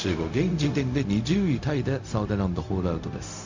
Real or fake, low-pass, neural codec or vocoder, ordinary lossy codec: fake; 7.2 kHz; codec, 16 kHz, 0.4 kbps, LongCat-Audio-Codec; MP3, 48 kbps